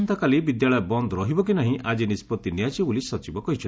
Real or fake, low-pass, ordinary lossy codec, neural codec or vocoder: real; none; none; none